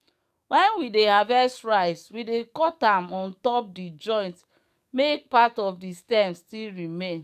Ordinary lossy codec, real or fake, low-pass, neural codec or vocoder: none; fake; 14.4 kHz; codec, 44.1 kHz, 7.8 kbps, DAC